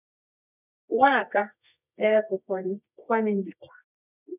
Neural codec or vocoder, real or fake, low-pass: codec, 24 kHz, 0.9 kbps, WavTokenizer, medium music audio release; fake; 3.6 kHz